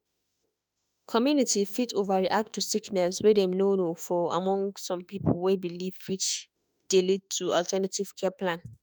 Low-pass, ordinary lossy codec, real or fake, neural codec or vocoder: none; none; fake; autoencoder, 48 kHz, 32 numbers a frame, DAC-VAE, trained on Japanese speech